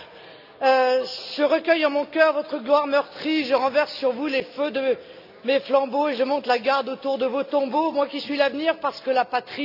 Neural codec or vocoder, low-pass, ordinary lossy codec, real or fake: none; 5.4 kHz; none; real